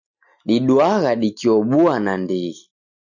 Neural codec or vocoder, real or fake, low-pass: none; real; 7.2 kHz